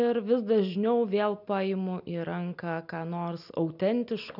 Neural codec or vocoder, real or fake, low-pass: none; real; 5.4 kHz